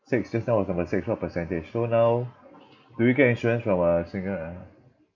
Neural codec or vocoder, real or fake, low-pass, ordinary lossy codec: none; real; 7.2 kHz; none